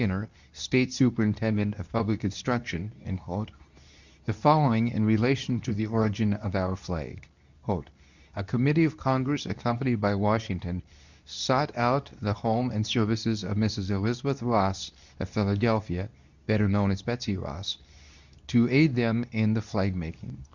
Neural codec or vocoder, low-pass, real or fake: codec, 24 kHz, 0.9 kbps, WavTokenizer, medium speech release version 2; 7.2 kHz; fake